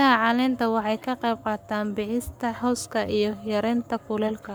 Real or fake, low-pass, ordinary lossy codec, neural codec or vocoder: fake; none; none; codec, 44.1 kHz, 7.8 kbps, Pupu-Codec